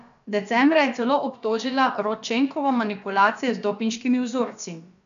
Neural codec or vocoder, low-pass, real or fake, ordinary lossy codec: codec, 16 kHz, about 1 kbps, DyCAST, with the encoder's durations; 7.2 kHz; fake; none